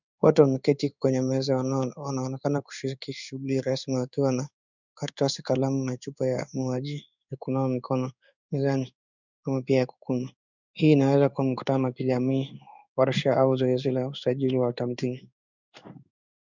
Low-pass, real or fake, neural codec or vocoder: 7.2 kHz; fake; codec, 16 kHz in and 24 kHz out, 1 kbps, XY-Tokenizer